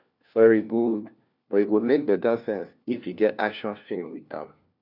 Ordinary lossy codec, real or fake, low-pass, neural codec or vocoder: none; fake; 5.4 kHz; codec, 16 kHz, 1 kbps, FunCodec, trained on LibriTTS, 50 frames a second